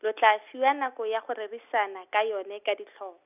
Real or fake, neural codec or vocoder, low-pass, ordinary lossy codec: real; none; 3.6 kHz; none